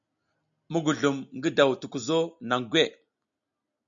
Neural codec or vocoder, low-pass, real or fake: none; 7.2 kHz; real